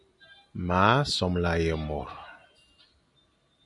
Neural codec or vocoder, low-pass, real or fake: none; 10.8 kHz; real